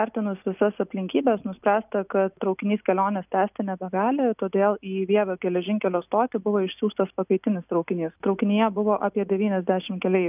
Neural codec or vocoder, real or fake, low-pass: none; real; 3.6 kHz